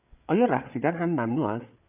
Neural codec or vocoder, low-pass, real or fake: codec, 16 kHz, 8 kbps, FreqCodec, larger model; 3.6 kHz; fake